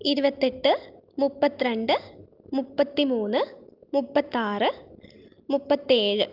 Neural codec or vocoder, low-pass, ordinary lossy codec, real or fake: none; 5.4 kHz; Opus, 24 kbps; real